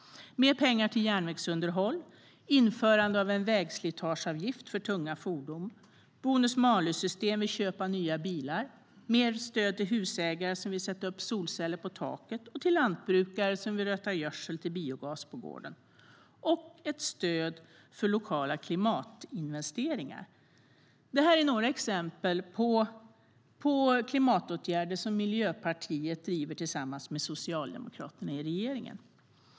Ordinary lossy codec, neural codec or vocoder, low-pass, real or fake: none; none; none; real